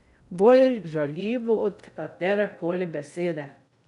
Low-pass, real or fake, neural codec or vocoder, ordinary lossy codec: 10.8 kHz; fake; codec, 16 kHz in and 24 kHz out, 0.6 kbps, FocalCodec, streaming, 2048 codes; none